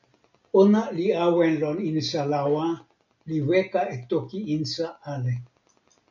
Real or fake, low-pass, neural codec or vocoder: real; 7.2 kHz; none